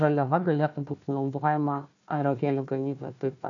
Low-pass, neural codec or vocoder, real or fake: 7.2 kHz; codec, 16 kHz, 1 kbps, FunCodec, trained on Chinese and English, 50 frames a second; fake